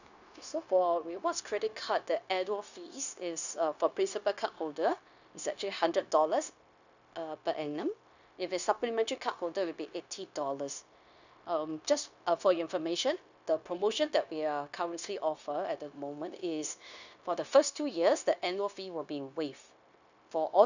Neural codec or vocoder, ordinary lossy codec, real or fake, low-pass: codec, 16 kHz, 0.9 kbps, LongCat-Audio-Codec; none; fake; 7.2 kHz